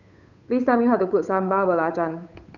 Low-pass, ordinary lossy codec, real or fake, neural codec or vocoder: 7.2 kHz; none; fake; codec, 16 kHz, 8 kbps, FunCodec, trained on Chinese and English, 25 frames a second